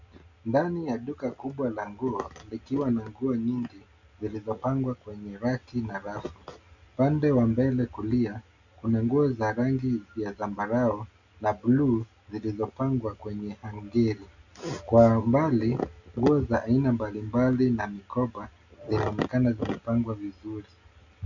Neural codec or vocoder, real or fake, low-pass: none; real; 7.2 kHz